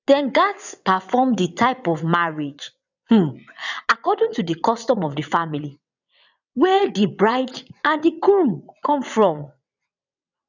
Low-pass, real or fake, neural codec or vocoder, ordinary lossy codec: 7.2 kHz; fake; vocoder, 22.05 kHz, 80 mel bands, WaveNeXt; none